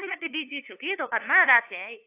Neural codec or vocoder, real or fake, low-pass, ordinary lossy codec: codec, 16 kHz, 2 kbps, FunCodec, trained on LibriTTS, 25 frames a second; fake; 3.6 kHz; AAC, 24 kbps